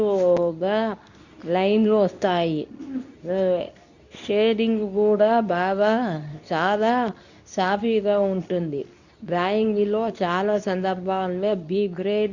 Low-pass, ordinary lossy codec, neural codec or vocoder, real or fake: 7.2 kHz; none; codec, 24 kHz, 0.9 kbps, WavTokenizer, medium speech release version 2; fake